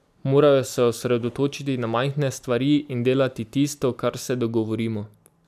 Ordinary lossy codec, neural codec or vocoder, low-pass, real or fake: none; none; 14.4 kHz; real